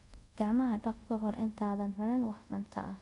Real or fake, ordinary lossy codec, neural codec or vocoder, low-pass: fake; none; codec, 24 kHz, 0.5 kbps, DualCodec; 10.8 kHz